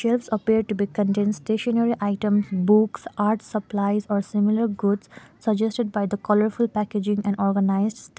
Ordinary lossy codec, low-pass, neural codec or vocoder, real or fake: none; none; none; real